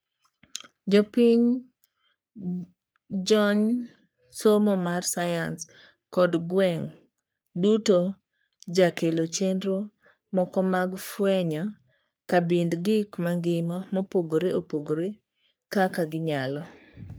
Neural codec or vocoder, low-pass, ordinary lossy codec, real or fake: codec, 44.1 kHz, 3.4 kbps, Pupu-Codec; none; none; fake